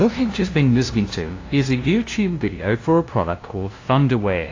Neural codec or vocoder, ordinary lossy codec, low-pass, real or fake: codec, 16 kHz, 0.5 kbps, FunCodec, trained on LibriTTS, 25 frames a second; AAC, 32 kbps; 7.2 kHz; fake